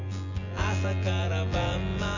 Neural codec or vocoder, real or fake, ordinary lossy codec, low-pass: vocoder, 24 kHz, 100 mel bands, Vocos; fake; none; 7.2 kHz